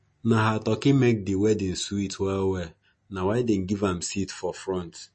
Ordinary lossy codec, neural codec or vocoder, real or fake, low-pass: MP3, 32 kbps; none; real; 10.8 kHz